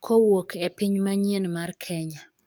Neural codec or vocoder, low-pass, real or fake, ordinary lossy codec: codec, 44.1 kHz, 7.8 kbps, DAC; none; fake; none